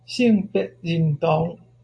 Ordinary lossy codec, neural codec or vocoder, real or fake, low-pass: AAC, 64 kbps; none; real; 9.9 kHz